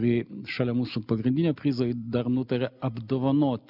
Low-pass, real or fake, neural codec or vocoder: 5.4 kHz; real; none